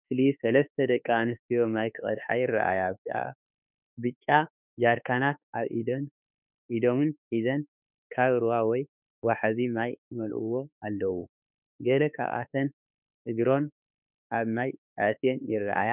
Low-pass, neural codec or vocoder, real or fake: 3.6 kHz; autoencoder, 48 kHz, 32 numbers a frame, DAC-VAE, trained on Japanese speech; fake